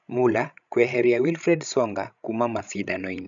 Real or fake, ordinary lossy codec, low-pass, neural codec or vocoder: fake; none; 7.2 kHz; codec, 16 kHz, 16 kbps, FreqCodec, larger model